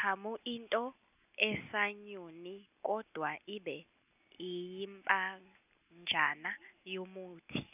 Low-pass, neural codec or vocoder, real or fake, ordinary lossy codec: 3.6 kHz; none; real; none